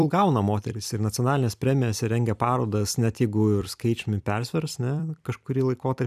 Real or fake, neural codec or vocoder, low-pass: fake; vocoder, 44.1 kHz, 128 mel bands every 256 samples, BigVGAN v2; 14.4 kHz